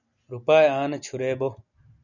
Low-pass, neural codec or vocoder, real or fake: 7.2 kHz; none; real